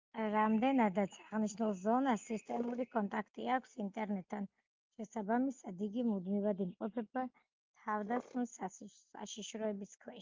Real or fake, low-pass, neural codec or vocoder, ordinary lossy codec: real; 7.2 kHz; none; Opus, 24 kbps